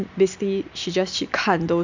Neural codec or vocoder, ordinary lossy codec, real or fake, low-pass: none; none; real; 7.2 kHz